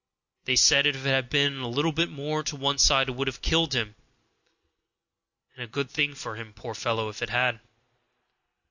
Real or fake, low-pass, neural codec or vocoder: real; 7.2 kHz; none